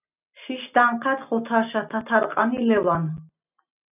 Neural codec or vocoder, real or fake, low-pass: none; real; 3.6 kHz